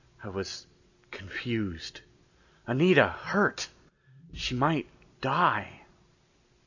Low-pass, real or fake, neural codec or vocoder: 7.2 kHz; real; none